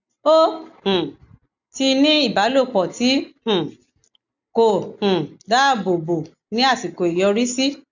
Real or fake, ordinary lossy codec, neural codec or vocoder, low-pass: real; none; none; 7.2 kHz